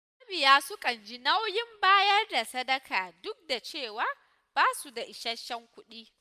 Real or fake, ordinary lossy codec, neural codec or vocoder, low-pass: real; none; none; 14.4 kHz